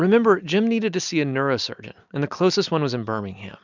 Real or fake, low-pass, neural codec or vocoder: real; 7.2 kHz; none